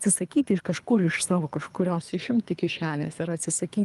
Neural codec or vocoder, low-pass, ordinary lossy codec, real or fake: codec, 24 kHz, 3 kbps, HILCodec; 10.8 kHz; Opus, 32 kbps; fake